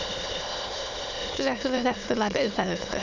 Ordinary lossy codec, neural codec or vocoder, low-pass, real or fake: none; autoencoder, 22.05 kHz, a latent of 192 numbers a frame, VITS, trained on many speakers; 7.2 kHz; fake